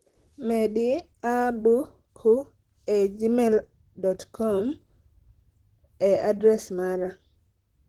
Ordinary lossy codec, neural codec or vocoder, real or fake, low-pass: Opus, 16 kbps; vocoder, 44.1 kHz, 128 mel bands, Pupu-Vocoder; fake; 19.8 kHz